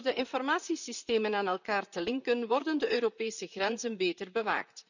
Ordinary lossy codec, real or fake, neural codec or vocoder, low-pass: none; fake; vocoder, 44.1 kHz, 128 mel bands, Pupu-Vocoder; 7.2 kHz